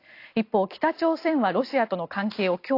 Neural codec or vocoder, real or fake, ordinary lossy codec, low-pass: none; real; AAC, 32 kbps; 5.4 kHz